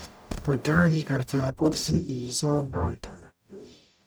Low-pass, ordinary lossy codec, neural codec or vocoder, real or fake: none; none; codec, 44.1 kHz, 0.9 kbps, DAC; fake